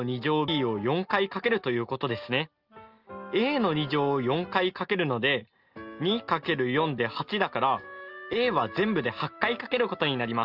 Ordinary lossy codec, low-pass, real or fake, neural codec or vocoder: Opus, 24 kbps; 5.4 kHz; real; none